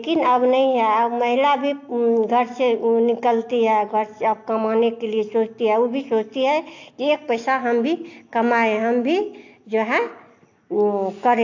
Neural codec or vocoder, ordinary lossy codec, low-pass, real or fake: none; AAC, 48 kbps; 7.2 kHz; real